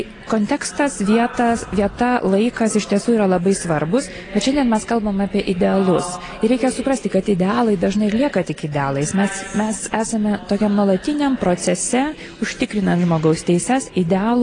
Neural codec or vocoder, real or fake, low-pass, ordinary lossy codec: none; real; 9.9 kHz; AAC, 32 kbps